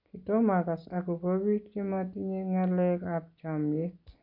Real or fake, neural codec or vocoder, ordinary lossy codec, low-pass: real; none; none; 5.4 kHz